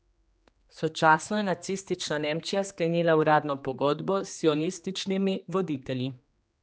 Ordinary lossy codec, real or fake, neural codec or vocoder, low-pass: none; fake; codec, 16 kHz, 2 kbps, X-Codec, HuBERT features, trained on general audio; none